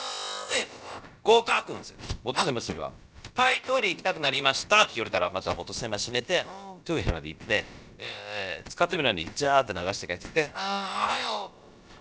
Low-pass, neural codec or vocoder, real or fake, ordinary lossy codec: none; codec, 16 kHz, about 1 kbps, DyCAST, with the encoder's durations; fake; none